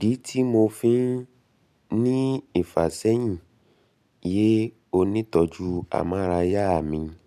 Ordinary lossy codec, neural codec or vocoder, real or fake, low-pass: none; none; real; 14.4 kHz